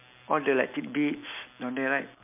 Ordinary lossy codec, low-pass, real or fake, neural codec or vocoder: MP3, 32 kbps; 3.6 kHz; real; none